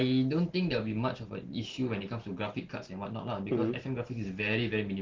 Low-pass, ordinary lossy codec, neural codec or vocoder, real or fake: 7.2 kHz; Opus, 16 kbps; none; real